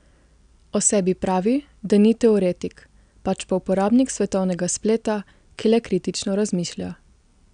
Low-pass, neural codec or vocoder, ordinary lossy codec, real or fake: 9.9 kHz; none; none; real